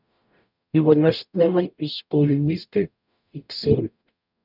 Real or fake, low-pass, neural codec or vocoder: fake; 5.4 kHz; codec, 44.1 kHz, 0.9 kbps, DAC